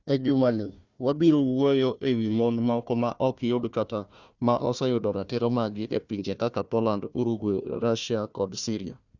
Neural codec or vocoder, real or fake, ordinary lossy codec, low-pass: codec, 16 kHz, 1 kbps, FunCodec, trained on Chinese and English, 50 frames a second; fake; Opus, 64 kbps; 7.2 kHz